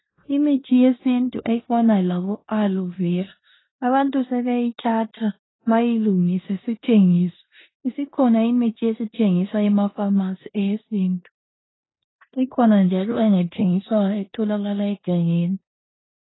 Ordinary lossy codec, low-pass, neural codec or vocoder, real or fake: AAC, 16 kbps; 7.2 kHz; codec, 16 kHz in and 24 kHz out, 0.9 kbps, LongCat-Audio-Codec, four codebook decoder; fake